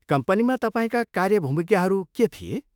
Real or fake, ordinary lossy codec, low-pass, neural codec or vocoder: fake; none; 19.8 kHz; autoencoder, 48 kHz, 32 numbers a frame, DAC-VAE, trained on Japanese speech